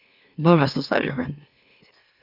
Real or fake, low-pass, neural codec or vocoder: fake; 5.4 kHz; autoencoder, 44.1 kHz, a latent of 192 numbers a frame, MeloTTS